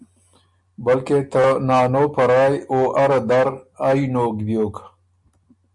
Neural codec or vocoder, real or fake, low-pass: none; real; 10.8 kHz